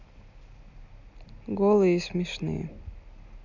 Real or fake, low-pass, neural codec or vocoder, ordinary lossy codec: real; 7.2 kHz; none; none